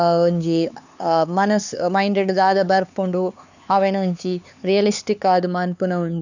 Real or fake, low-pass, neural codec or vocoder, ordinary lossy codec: fake; 7.2 kHz; codec, 16 kHz, 4 kbps, X-Codec, HuBERT features, trained on LibriSpeech; none